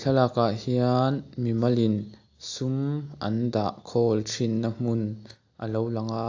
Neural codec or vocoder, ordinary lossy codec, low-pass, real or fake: none; AAC, 48 kbps; 7.2 kHz; real